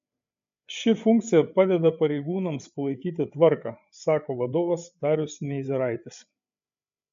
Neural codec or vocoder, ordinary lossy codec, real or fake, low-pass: codec, 16 kHz, 8 kbps, FreqCodec, larger model; MP3, 48 kbps; fake; 7.2 kHz